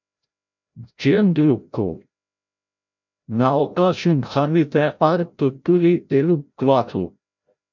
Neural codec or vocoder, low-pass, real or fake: codec, 16 kHz, 0.5 kbps, FreqCodec, larger model; 7.2 kHz; fake